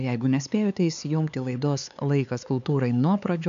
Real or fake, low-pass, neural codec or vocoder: fake; 7.2 kHz; codec, 16 kHz, 4 kbps, X-Codec, HuBERT features, trained on LibriSpeech